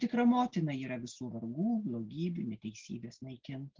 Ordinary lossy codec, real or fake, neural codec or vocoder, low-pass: Opus, 16 kbps; real; none; 7.2 kHz